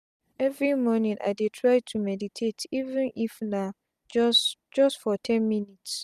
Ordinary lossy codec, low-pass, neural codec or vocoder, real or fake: none; 14.4 kHz; none; real